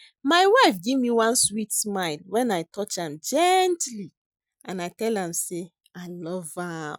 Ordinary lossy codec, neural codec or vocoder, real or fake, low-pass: none; none; real; none